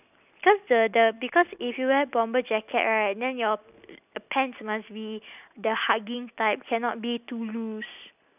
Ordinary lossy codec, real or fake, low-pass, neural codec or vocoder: none; real; 3.6 kHz; none